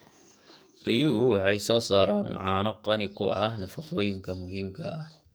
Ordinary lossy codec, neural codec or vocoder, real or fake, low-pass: none; codec, 44.1 kHz, 2.6 kbps, SNAC; fake; none